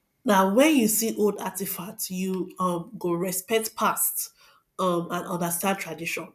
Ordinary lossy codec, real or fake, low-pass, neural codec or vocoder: none; real; 14.4 kHz; none